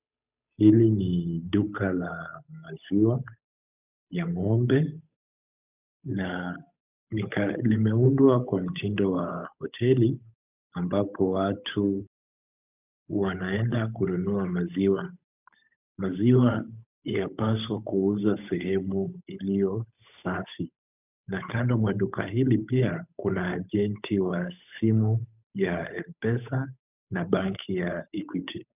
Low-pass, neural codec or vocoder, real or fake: 3.6 kHz; codec, 16 kHz, 8 kbps, FunCodec, trained on Chinese and English, 25 frames a second; fake